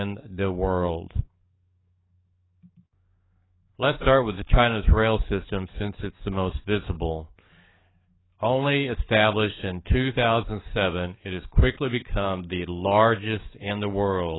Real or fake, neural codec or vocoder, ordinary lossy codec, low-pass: fake; codec, 44.1 kHz, 7.8 kbps, DAC; AAC, 16 kbps; 7.2 kHz